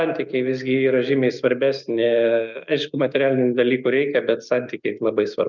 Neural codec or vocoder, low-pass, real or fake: none; 7.2 kHz; real